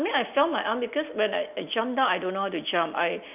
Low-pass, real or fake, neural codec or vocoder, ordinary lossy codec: 3.6 kHz; real; none; none